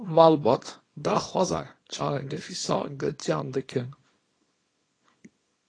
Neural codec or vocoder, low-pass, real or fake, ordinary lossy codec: codec, 24 kHz, 0.9 kbps, WavTokenizer, small release; 9.9 kHz; fake; AAC, 32 kbps